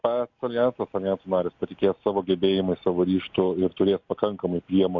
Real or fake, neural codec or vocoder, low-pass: real; none; 7.2 kHz